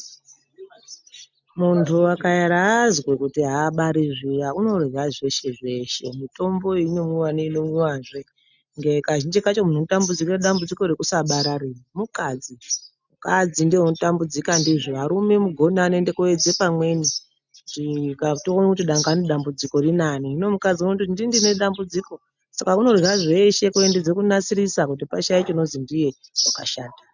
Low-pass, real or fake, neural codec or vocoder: 7.2 kHz; real; none